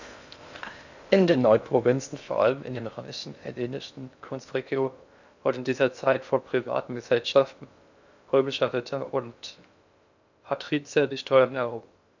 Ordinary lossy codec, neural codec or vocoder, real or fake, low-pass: none; codec, 16 kHz in and 24 kHz out, 0.6 kbps, FocalCodec, streaming, 2048 codes; fake; 7.2 kHz